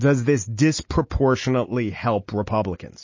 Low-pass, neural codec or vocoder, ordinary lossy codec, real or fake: 7.2 kHz; none; MP3, 32 kbps; real